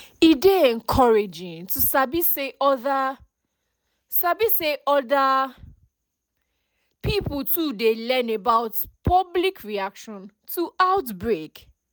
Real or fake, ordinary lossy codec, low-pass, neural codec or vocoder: real; none; none; none